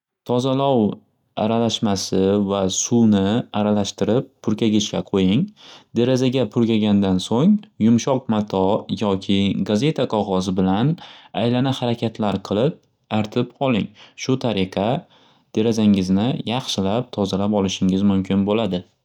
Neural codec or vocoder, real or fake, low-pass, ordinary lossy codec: none; real; 19.8 kHz; none